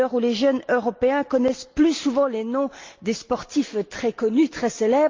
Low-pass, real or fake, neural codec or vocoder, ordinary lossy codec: 7.2 kHz; real; none; Opus, 24 kbps